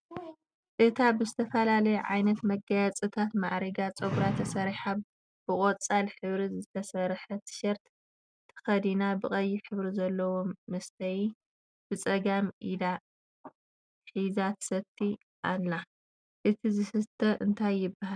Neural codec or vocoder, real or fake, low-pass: none; real; 9.9 kHz